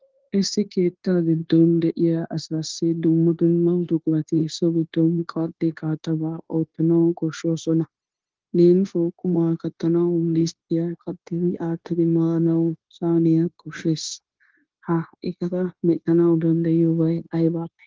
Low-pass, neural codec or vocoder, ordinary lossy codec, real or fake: 7.2 kHz; codec, 16 kHz, 0.9 kbps, LongCat-Audio-Codec; Opus, 16 kbps; fake